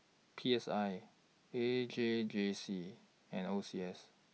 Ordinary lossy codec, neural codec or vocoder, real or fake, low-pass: none; none; real; none